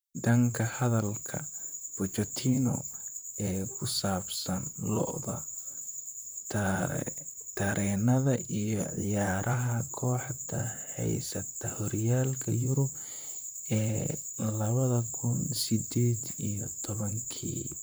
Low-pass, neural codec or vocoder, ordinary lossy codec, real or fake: none; vocoder, 44.1 kHz, 128 mel bands, Pupu-Vocoder; none; fake